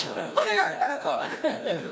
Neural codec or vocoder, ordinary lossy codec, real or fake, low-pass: codec, 16 kHz, 1 kbps, FreqCodec, larger model; none; fake; none